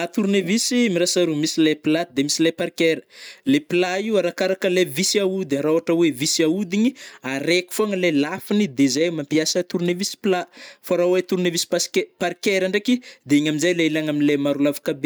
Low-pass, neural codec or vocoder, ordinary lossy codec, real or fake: none; none; none; real